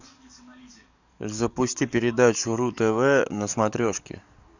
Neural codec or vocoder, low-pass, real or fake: codec, 44.1 kHz, 7.8 kbps, DAC; 7.2 kHz; fake